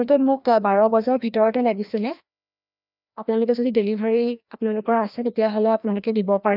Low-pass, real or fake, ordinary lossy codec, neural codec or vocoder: 5.4 kHz; fake; none; codec, 16 kHz, 1 kbps, FreqCodec, larger model